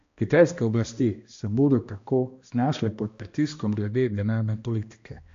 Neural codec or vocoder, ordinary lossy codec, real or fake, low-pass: codec, 16 kHz, 1 kbps, X-Codec, HuBERT features, trained on balanced general audio; AAC, 48 kbps; fake; 7.2 kHz